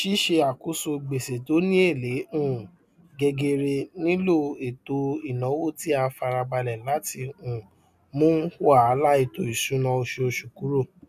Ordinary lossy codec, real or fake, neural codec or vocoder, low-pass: none; real; none; 14.4 kHz